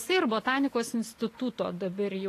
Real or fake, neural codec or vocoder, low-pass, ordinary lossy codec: real; none; 14.4 kHz; AAC, 48 kbps